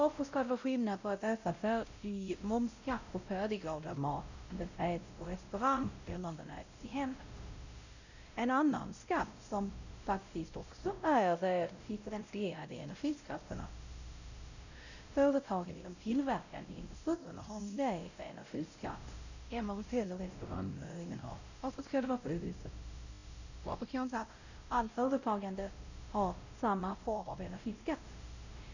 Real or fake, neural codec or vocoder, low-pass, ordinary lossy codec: fake; codec, 16 kHz, 0.5 kbps, X-Codec, WavLM features, trained on Multilingual LibriSpeech; 7.2 kHz; none